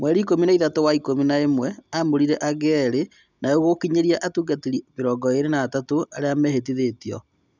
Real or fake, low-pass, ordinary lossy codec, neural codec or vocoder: real; 7.2 kHz; none; none